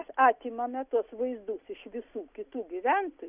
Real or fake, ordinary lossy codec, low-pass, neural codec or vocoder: real; Opus, 64 kbps; 3.6 kHz; none